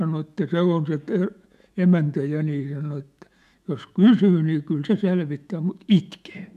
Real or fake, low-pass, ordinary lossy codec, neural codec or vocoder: fake; 14.4 kHz; MP3, 96 kbps; autoencoder, 48 kHz, 128 numbers a frame, DAC-VAE, trained on Japanese speech